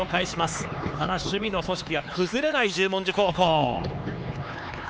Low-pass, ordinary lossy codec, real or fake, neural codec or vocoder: none; none; fake; codec, 16 kHz, 4 kbps, X-Codec, HuBERT features, trained on LibriSpeech